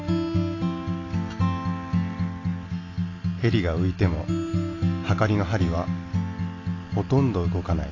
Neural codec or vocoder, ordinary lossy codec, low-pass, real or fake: none; AAC, 32 kbps; 7.2 kHz; real